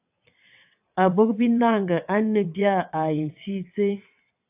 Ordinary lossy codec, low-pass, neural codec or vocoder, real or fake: AAC, 32 kbps; 3.6 kHz; vocoder, 22.05 kHz, 80 mel bands, WaveNeXt; fake